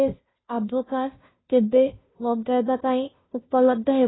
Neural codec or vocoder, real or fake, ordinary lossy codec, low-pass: codec, 16 kHz, 0.5 kbps, FunCodec, trained on Chinese and English, 25 frames a second; fake; AAC, 16 kbps; 7.2 kHz